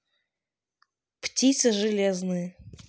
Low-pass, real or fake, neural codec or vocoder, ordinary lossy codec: none; real; none; none